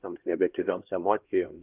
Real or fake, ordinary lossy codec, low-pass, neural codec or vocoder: fake; Opus, 32 kbps; 3.6 kHz; codec, 16 kHz, 1 kbps, X-Codec, HuBERT features, trained on LibriSpeech